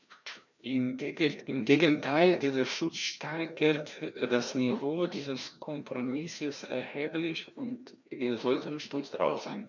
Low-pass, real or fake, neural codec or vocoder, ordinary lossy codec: 7.2 kHz; fake; codec, 16 kHz, 1 kbps, FreqCodec, larger model; none